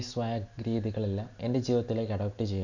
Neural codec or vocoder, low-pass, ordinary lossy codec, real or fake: none; 7.2 kHz; none; real